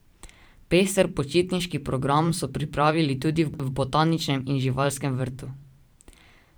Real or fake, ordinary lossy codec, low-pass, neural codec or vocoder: fake; none; none; vocoder, 44.1 kHz, 128 mel bands every 512 samples, BigVGAN v2